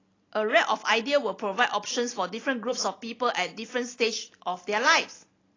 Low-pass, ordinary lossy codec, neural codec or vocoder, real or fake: 7.2 kHz; AAC, 32 kbps; none; real